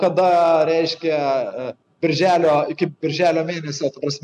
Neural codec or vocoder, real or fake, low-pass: none; real; 10.8 kHz